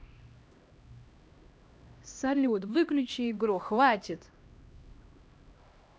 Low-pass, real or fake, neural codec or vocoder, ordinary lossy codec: none; fake; codec, 16 kHz, 1 kbps, X-Codec, HuBERT features, trained on LibriSpeech; none